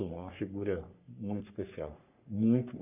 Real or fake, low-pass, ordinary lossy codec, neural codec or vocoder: fake; 3.6 kHz; none; codec, 44.1 kHz, 3.4 kbps, Pupu-Codec